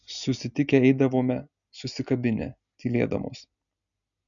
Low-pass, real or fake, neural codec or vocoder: 7.2 kHz; real; none